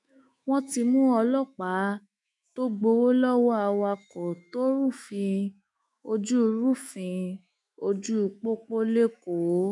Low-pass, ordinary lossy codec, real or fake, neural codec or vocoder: 10.8 kHz; none; fake; autoencoder, 48 kHz, 128 numbers a frame, DAC-VAE, trained on Japanese speech